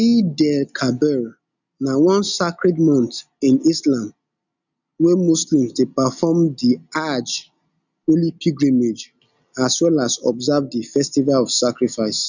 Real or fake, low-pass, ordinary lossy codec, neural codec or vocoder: real; 7.2 kHz; none; none